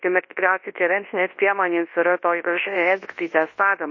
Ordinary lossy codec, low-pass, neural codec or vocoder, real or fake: MP3, 32 kbps; 7.2 kHz; codec, 24 kHz, 0.9 kbps, WavTokenizer, large speech release; fake